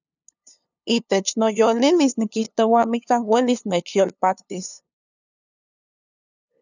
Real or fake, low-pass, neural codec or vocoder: fake; 7.2 kHz; codec, 16 kHz, 2 kbps, FunCodec, trained on LibriTTS, 25 frames a second